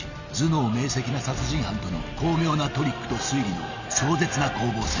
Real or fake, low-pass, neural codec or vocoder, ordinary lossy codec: real; 7.2 kHz; none; none